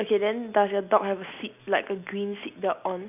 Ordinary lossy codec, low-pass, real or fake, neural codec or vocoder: none; 3.6 kHz; real; none